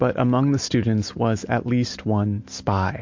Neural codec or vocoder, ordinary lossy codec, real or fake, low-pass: none; MP3, 48 kbps; real; 7.2 kHz